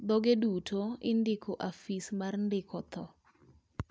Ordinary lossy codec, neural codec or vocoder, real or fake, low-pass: none; none; real; none